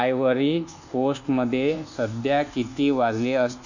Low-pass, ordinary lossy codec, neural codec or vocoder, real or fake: 7.2 kHz; none; codec, 24 kHz, 1.2 kbps, DualCodec; fake